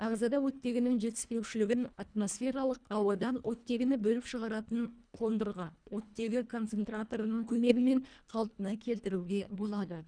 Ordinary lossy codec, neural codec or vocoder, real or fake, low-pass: none; codec, 24 kHz, 1.5 kbps, HILCodec; fake; 9.9 kHz